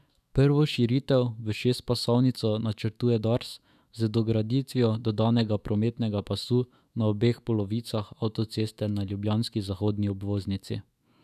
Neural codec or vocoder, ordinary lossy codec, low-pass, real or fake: autoencoder, 48 kHz, 128 numbers a frame, DAC-VAE, trained on Japanese speech; none; 14.4 kHz; fake